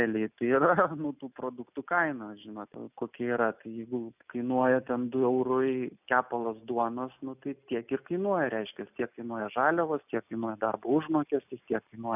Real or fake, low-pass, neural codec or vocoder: real; 3.6 kHz; none